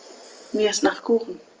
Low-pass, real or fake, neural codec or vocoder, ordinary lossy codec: 7.2 kHz; real; none; Opus, 24 kbps